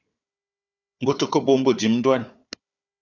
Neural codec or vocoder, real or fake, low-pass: codec, 16 kHz, 4 kbps, FunCodec, trained on Chinese and English, 50 frames a second; fake; 7.2 kHz